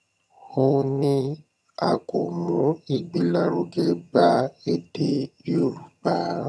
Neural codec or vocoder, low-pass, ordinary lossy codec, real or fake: vocoder, 22.05 kHz, 80 mel bands, HiFi-GAN; none; none; fake